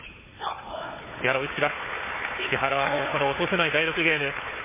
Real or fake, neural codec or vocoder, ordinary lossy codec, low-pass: fake; codec, 16 kHz, 4 kbps, FunCodec, trained on Chinese and English, 50 frames a second; MP3, 16 kbps; 3.6 kHz